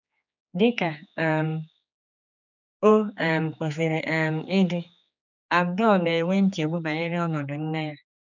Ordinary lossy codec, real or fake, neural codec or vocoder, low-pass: none; fake; codec, 16 kHz, 4 kbps, X-Codec, HuBERT features, trained on general audio; 7.2 kHz